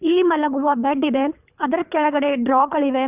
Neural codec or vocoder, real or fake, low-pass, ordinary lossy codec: codec, 24 kHz, 3 kbps, HILCodec; fake; 3.6 kHz; none